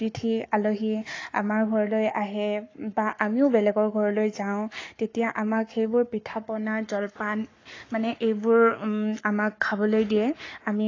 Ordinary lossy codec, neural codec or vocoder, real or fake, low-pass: AAC, 32 kbps; codec, 44.1 kHz, 7.8 kbps, Pupu-Codec; fake; 7.2 kHz